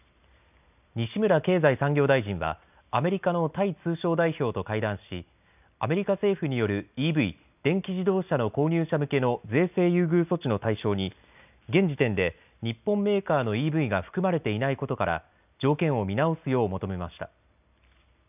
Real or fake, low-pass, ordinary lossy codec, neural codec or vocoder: real; 3.6 kHz; none; none